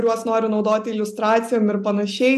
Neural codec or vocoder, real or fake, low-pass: none; real; 14.4 kHz